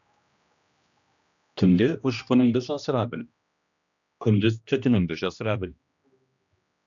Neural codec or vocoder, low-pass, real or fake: codec, 16 kHz, 1 kbps, X-Codec, HuBERT features, trained on general audio; 7.2 kHz; fake